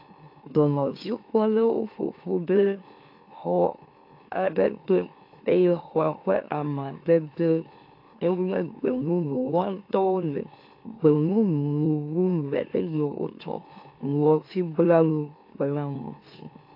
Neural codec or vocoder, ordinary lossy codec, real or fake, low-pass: autoencoder, 44.1 kHz, a latent of 192 numbers a frame, MeloTTS; AAC, 32 kbps; fake; 5.4 kHz